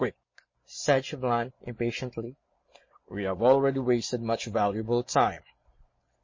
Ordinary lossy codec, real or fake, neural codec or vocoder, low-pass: MP3, 32 kbps; real; none; 7.2 kHz